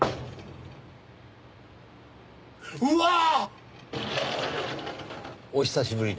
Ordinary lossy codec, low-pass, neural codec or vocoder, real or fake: none; none; none; real